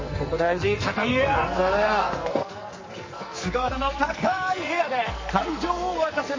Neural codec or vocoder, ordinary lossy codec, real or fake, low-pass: codec, 44.1 kHz, 2.6 kbps, SNAC; MP3, 32 kbps; fake; 7.2 kHz